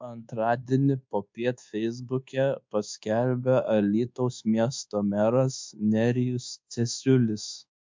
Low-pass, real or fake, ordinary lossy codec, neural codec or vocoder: 7.2 kHz; fake; MP3, 64 kbps; codec, 24 kHz, 1.2 kbps, DualCodec